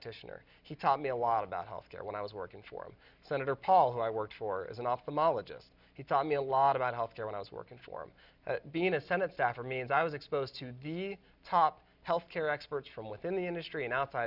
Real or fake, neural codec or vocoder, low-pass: real; none; 5.4 kHz